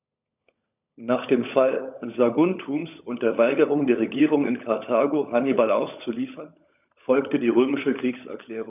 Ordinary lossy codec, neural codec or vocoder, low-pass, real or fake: none; codec, 16 kHz, 16 kbps, FunCodec, trained on LibriTTS, 50 frames a second; 3.6 kHz; fake